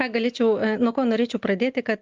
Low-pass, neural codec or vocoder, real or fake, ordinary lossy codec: 7.2 kHz; none; real; Opus, 32 kbps